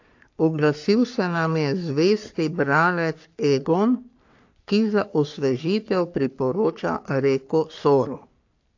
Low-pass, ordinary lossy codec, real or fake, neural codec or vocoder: 7.2 kHz; AAC, 48 kbps; fake; codec, 44.1 kHz, 3.4 kbps, Pupu-Codec